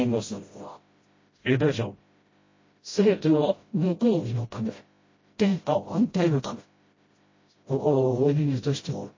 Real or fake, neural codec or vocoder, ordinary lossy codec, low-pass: fake; codec, 16 kHz, 0.5 kbps, FreqCodec, smaller model; MP3, 32 kbps; 7.2 kHz